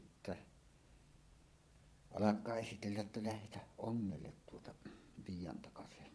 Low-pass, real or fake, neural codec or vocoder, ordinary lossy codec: none; fake; vocoder, 22.05 kHz, 80 mel bands, Vocos; none